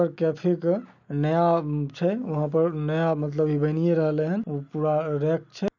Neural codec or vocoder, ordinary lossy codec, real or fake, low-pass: none; none; real; 7.2 kHz